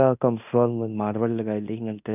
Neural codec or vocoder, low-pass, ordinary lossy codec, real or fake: codec, 16 kHz in and 24 kHz out, 0.9 kbps, LongCat-Audio-Codec, fine tuned four codebook decoder; 3.6 kHz; none; fake